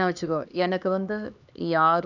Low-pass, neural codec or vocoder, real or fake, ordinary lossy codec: 7.2 kHz; codec, 16 kHz, 2 kbps, X-Codec, HuBERT features, trained on LibriSpeech; fake; none